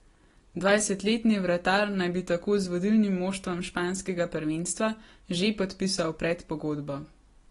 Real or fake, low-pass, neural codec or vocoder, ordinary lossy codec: real; 10.8 kHz; none; AAC, 32 kbps